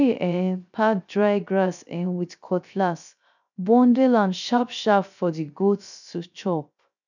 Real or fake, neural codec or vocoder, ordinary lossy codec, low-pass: fake; codec, 16 kHz, 0.3 kbps, FocalCodec; none; 7.2 kHz